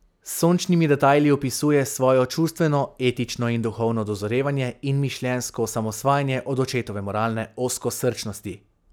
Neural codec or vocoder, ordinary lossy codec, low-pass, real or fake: none; none; none; real